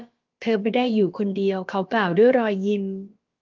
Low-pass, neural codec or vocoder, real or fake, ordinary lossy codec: 7.2 kHz; codec, 16 kHz, about 1 kbps, DyCAST, with the encoder's durations; fake; Opus, 32 kbps